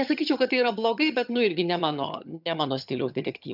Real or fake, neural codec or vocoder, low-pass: fake; vocoder, 22.05 kHz, 80 mel bands, HiFi-GAN; 5.4 kHz